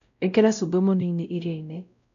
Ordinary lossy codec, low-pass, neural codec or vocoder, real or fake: none; 7.2 kHz; codec, 16 kHz, 0.5 kbps, X-Codec, WavLM features, trained on Multilingual LibriSpeech; fake